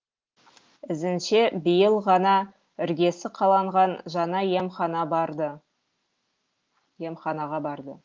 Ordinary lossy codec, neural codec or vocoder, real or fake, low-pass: Opus, 32 kbps; none; real; 7.2 kHz